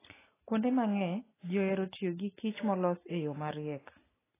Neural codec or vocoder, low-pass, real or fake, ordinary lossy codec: none; 3.6 kHz; real; AAC, 16 kbps